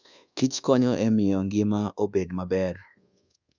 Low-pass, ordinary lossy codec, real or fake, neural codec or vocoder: 7.2 kHz; none; fake; codec, 24 kHz, 1.2 kbps, DualCodec